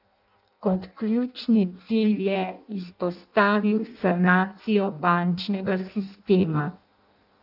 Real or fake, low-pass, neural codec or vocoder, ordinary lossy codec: fake; 5.4 kHz; codec, 16 kHz in and 24 kHz out, 0.6 kbps, FireRedTTS-2 codec; none